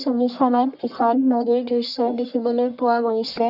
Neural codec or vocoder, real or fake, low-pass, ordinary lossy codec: codec, 44.1 kHz, 1.7 kbps, Pupu-Codec; fake; 5.4 kHz; none